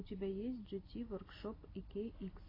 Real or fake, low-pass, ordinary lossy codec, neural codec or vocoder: real; 5.4 kHz; AAC, 24 kbps; none